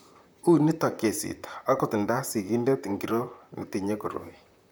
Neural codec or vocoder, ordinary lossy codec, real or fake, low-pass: vocoder, 44.1 kHz, 128 mel bands, Pupu-Vocoder; none; fake; none